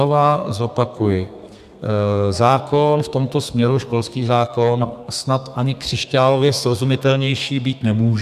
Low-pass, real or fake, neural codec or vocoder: 14.4 kHz; fake; codec, 32 kHz, 1.9 kbps, SNAC